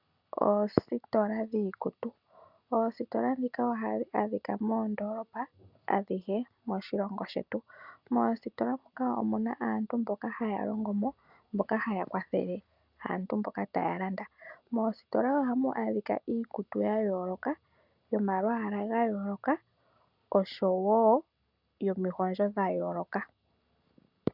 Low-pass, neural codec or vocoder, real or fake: 5.4 kHz; none; real